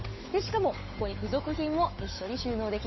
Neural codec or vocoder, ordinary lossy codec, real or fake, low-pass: codec, 16 kHz, 8 kbps, FunCodec, trained on Chinese and English, 25 frames a second; MP3, 24 kbps; fake; 7.2 kHz